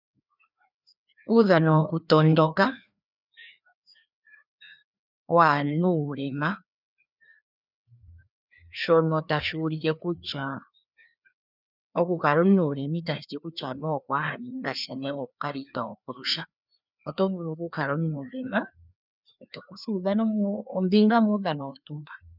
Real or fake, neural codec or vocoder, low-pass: fake; codec, 16 kHz, 2 kbps, FreqCodec, larger model; 5.4 kHz